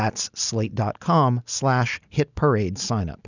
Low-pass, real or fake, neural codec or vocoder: 7.2 kHz; real; none